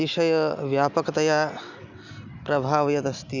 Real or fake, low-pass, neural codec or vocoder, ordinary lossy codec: real; 7.2 kHz; none; none